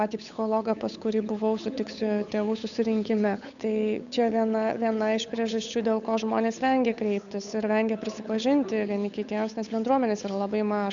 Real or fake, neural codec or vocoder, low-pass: fake; codec, 16 kHz, 8 kbps, FunCodec, trained on Chinese and English, 25 frames a second; 7.2 kHz